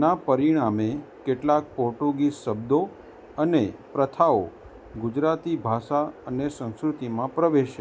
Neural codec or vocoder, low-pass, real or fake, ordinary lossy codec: none; none; real; none